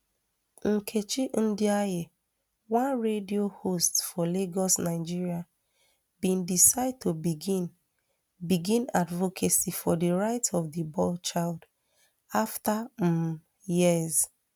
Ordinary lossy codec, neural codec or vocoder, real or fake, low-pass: none; none; real; none